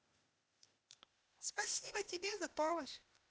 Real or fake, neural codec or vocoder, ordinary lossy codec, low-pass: fake; codec, 16 kHz, 0.8 kbps, ZipCodec; none; none